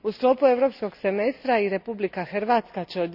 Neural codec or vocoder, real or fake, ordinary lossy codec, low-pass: none; real; none; 5.4 kHz